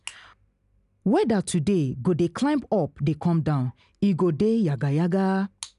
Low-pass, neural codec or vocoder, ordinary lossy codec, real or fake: 10.8 kHz; none; none; real